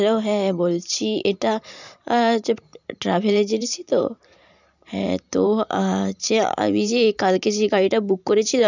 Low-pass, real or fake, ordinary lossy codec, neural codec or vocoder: 7.2 kHz; fake; none; vocoder, 44.1 kHz, 128 mel bands every 512 samples, BigVGAN v2